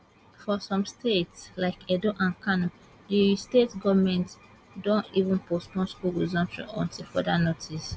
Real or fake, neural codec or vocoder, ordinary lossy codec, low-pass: real; none; none; none